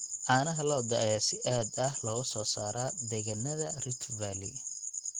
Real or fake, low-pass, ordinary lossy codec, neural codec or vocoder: real; 19.8 kHz; Opus, 16 kbps; none